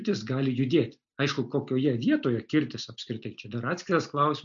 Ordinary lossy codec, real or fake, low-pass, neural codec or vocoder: MP3, 64 kbps; real; 7.2 kHz; none